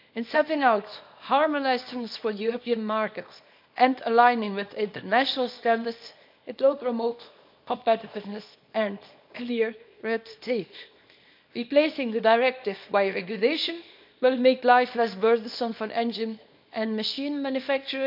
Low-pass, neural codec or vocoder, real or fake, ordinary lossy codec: 5.4 kHz; codec, 24 kHz, 0.9 kbps, WavTokenizer, small release; fake; MP3, 48 kbps